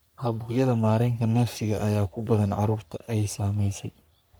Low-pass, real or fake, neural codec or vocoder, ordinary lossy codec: none; fake; codec, 44.1 kHz, 3.4 kbps, Pupu-Codec; none